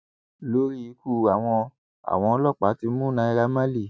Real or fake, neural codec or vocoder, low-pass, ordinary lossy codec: real; none; none; none